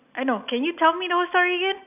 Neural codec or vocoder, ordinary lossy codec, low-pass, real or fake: none; none; 3.6 kHz; real